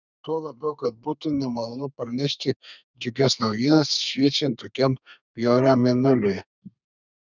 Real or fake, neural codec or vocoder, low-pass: fake; codec, 32 kHz, 1.9 kbps, SNAC; 7.2 kHz